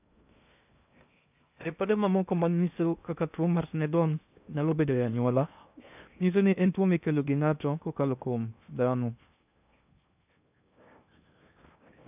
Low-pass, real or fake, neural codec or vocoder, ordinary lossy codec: 3.6 kHz; fake; codec, 16 kHz in and 24 kHz out, 0.6 kbps, FocalCodec, streaming, 4096 codes; none